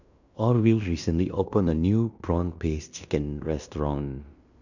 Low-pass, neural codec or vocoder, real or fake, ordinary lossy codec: 7.2 kHz; codec, 16 kHz in and 24 kHz out, 0.9 kbps, LongCat-Audio-Codec, fine tuned four codebook decoder; fake; none